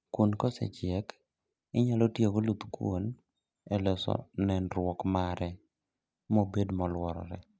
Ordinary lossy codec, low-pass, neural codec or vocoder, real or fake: none; none; none; real